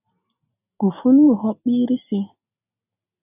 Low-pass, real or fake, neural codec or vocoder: 3.6 kHz; real; none